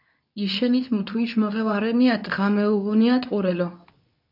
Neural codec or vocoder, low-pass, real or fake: codec, 24 kHz, 0.9 kbps, WavTokenizer, medium speech release version 2; 5.4 kHz; fake